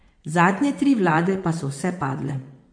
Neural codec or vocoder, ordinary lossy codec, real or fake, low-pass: vocoder, 22.05 kHz, 80 mel bands, WaveNeXt; MP3, 48 kbps; fake; 9.9 kHz